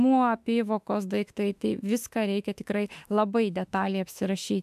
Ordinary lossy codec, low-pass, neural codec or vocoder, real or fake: AAC, 96 kbps; 14.4 kHz; autoencoder, 48 kHz, 32 numbers a frame, DAC-VAE, trained on Japanese speech; fake